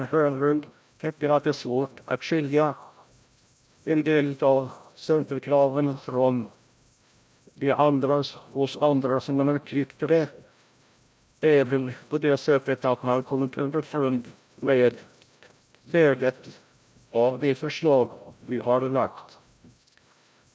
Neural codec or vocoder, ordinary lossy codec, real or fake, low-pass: codec, 16 kHz, 0.5 kbps, FreqCodec, larger model; none; fake; none